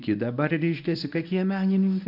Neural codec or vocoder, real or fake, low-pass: codec, 24 kHz, 0.9 kbps, WavTokenizer, medium speech release version 1; fake; 5.4 kHz